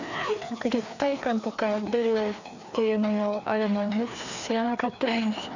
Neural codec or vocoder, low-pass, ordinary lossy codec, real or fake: codec, 16 kHz, 2 kbps, FreqCodec, larger model; 7.2 kHz; none; fake